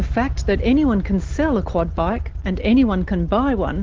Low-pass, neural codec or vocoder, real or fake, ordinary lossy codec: 7.2 kHz; none; real; Opus, 16 kbps